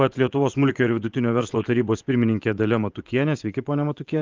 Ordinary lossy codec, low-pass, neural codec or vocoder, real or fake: Opus, 32 kbps; 7.2 kHz; vocoder, 24 kHz, 100 mel bands, Vocos; fake